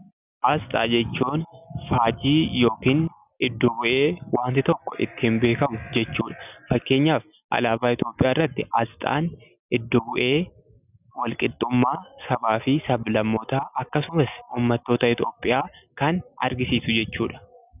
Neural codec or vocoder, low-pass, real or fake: none; 3.6 kHz; real